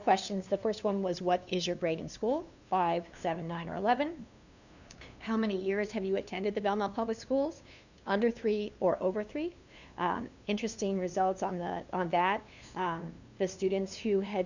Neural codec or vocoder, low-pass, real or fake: codec, 16 kHz, 2 kbps, FunCodec, trained on LibriTTS, 25 frames a second; 7.2 kHz; fake